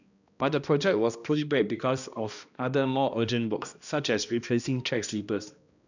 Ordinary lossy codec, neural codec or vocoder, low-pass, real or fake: none; codec, 16 kHz, 1 kbps, X-Codec, HuBERT features, trained on balanced general audio; 7.2 kHz; fake